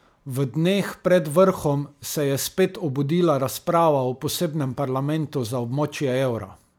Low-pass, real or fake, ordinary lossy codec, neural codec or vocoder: none; fake; none; vocoder, 44.1 kHz, 128 mel bands every 256 samples, BigVGAN v2